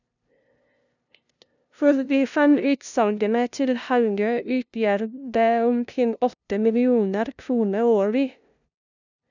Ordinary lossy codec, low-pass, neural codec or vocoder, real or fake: none; 7.2 kHz; codec, 16 kHz, 0.5 kbps, FunCodec, trained on LibriTTS, 25 frames a second; fake